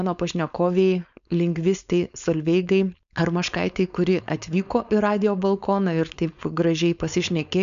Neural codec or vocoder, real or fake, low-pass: codec, 16 kHz, 4.8 kbps, FACodec; fake; 7.2 kHz